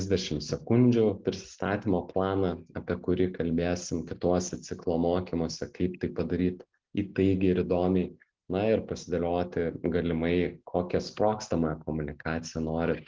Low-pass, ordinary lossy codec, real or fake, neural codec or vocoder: 7.2 kHz; Opus, 16 kbps; real; none